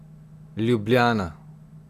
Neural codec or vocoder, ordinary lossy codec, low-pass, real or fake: none; none; 14.4 kHz; real